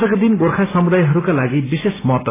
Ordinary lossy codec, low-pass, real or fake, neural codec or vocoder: AAC, 16 kbps; 3.6 kHz; real; none